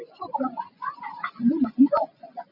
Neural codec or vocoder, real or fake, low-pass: vocoder, 24 kHz, 100 mel bands, Vocos; fake; 5.4 kHz